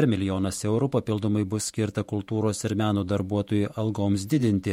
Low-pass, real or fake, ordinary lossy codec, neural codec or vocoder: 19.8 kHz; fake; MP3, 64 kbps; vocoder, 48 kHz, 128 mel bands, Vocos